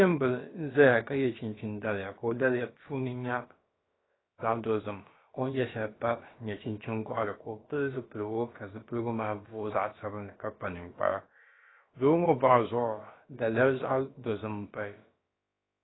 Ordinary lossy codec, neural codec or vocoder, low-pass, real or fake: AAC, 16 kbps; codec, 16 kHz, about 1 kbps, DyCAST, with the encoder's durations; 7.2 kHz; fake